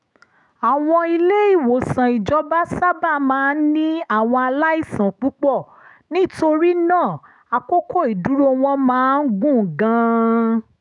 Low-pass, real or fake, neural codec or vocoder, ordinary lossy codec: 10.8 kHz; fake; autoencoder, 48 kHz, 128 numbers a frame, DAC-VAE, trained on Japanese speech; none